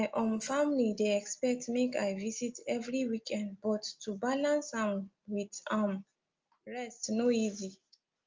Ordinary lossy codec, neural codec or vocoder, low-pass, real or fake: Opus, 24 kbps; none; 7.2 kHz; real